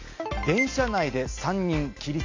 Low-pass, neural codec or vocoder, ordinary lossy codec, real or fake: 7.2 kHz; none; MP3, 48 kbps; real